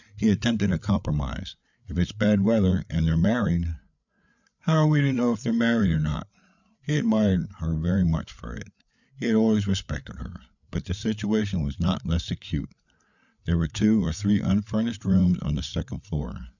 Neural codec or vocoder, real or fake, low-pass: codec, 16 kHz, 8 kbps, FreqCodec, larger model; fake; 7.2 kHz